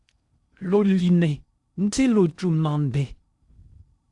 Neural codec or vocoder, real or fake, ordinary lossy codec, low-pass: codec, 16 kHz in and 24 kHz out, 0.6 kbps, FocalCodec, streaming, 4096 codes; fake; Opus, 64 kbps; 10.8 kHz